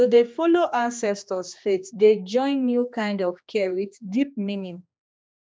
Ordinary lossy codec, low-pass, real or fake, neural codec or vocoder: none; none; fake; codec, 16 kHz, 2 kbps, X-Codec, HuBERT features, trained on general audio